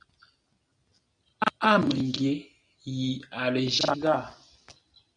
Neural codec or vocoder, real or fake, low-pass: none; real; 9.9 kHz